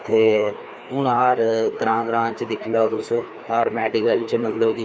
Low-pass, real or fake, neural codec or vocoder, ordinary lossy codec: none; fake; codec, 16 kHz, 2 kbps, FreqCodec, larger model; none